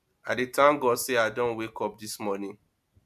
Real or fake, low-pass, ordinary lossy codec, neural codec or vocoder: real; 14.4 kHz; MP3, 96 kbps; none